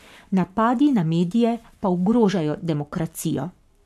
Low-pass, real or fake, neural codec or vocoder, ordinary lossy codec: 14.4 kHz; fake; codec, 44.1 kHz, 7.8 kbps, Pupu-Codec; none